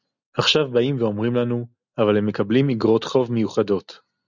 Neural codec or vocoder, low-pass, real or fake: none; 7.2 kHz; real